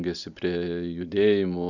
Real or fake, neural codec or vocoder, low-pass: real; none; 7.2 kHz